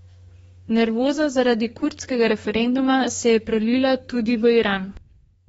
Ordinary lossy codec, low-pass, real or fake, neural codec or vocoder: AAC, 24 kbps; 14.4 kHz; fake; codec, 32 kHz, 1.9 kbps, SNAC